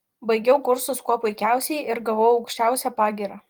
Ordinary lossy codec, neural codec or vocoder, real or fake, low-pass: Opus, 24 kbps; vocoder, 44.1 kHz, 128 mel bands every 256 samples, BigVGAN v2; fake; 19.8 kHz